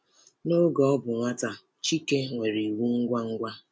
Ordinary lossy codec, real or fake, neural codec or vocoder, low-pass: none; real; none; none